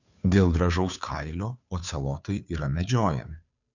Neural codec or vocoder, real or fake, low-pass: codec, 16 kHz, 2 kbps, FunCodec, trained on Chinese and English, 25 frames a second; fake; 7.2 kHz